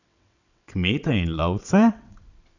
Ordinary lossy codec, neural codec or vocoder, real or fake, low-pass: none; vocoder, 22.05 kHz, 80 mel bands, WaveNeXt; fake; 7.2 kHz